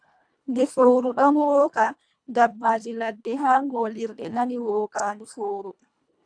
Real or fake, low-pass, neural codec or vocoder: fake; 9.9 kHz; codec, 24 kHz, 1.5 kbps, HILCodec